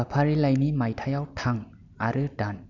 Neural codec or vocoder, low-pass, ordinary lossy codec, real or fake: none; 7.2 kHz; none; real